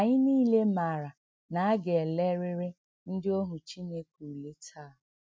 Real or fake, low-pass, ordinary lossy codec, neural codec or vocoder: real; none; none; none